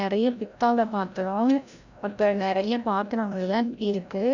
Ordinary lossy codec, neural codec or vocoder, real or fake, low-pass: none; codec, 16 kHz, 0.5 kbps, FreqCodec, larger model; fake; 7.2 kHz